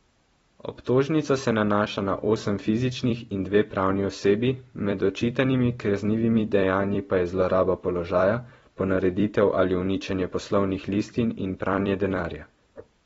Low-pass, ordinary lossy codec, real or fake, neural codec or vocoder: 19.8 kHz; AAC, 24 kbps; fake; vocoder, 44.1 kHz, 128 mel bands every 256 samples, BigVGAN v2